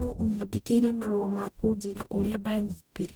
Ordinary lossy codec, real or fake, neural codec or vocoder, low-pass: none; fake; codec, 44.1 kHz, 0.9 kbps, DAC; none